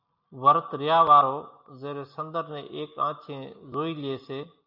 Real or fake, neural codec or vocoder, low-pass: real; none; 5.4 kHz